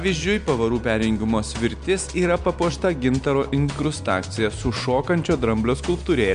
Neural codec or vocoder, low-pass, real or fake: none; 9.9 kHz; real